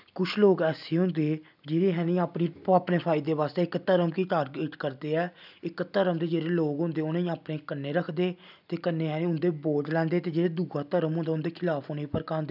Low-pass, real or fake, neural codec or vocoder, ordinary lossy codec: 5.4 kHz; real; none; none